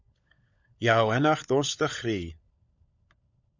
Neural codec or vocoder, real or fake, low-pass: codec, 16 kHz, 16 kbps, FunCodec, trained on LibriTTS, 50 frames a second; fake; 7.2 kHz